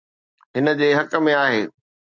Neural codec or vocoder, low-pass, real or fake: none; 7.2 kHz; real